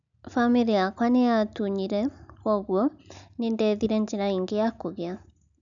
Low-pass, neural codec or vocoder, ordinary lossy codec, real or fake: 7.2 kHz; none; none; real